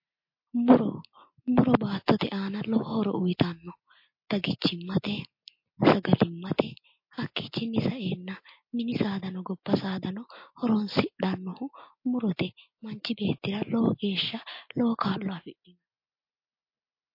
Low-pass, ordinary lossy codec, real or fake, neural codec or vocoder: 5.4 kHz; MP3, 32 kbps; real; none